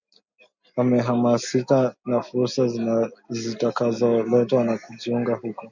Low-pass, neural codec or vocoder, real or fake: 7.2 kHz; none; real